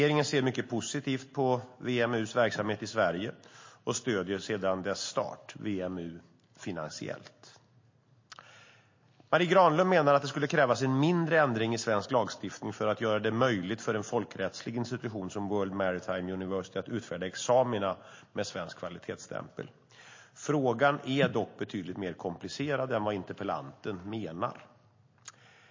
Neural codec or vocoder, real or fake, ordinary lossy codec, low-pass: none; real; MP3, 32 kbps; 7.2 kHz